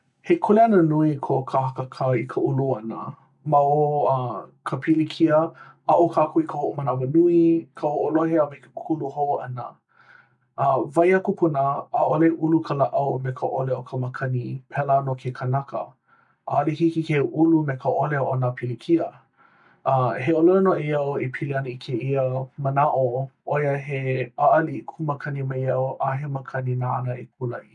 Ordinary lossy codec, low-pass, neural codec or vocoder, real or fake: none; 10.8 kHz; none; real